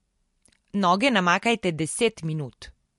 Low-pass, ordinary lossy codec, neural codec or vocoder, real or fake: 14.4 kHz; MP3, 48 kbps; none; real